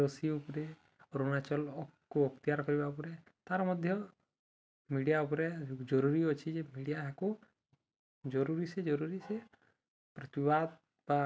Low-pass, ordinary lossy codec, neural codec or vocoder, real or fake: none; none; none; real